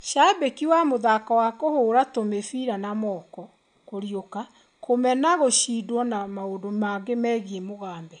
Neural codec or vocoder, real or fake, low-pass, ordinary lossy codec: none; real; 9.9 kHz; none